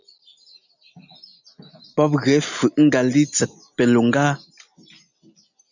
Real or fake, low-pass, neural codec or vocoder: real; 7.2 kHz; none